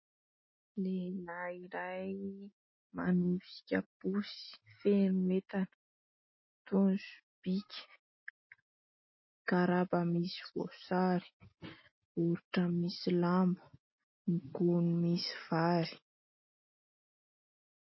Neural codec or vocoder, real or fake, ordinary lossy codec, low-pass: none; real; MP3, 24 kbps; 7.2 kHz